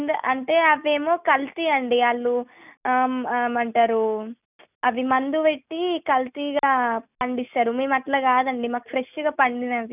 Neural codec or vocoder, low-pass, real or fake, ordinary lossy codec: none; 3.6 kHz; real; none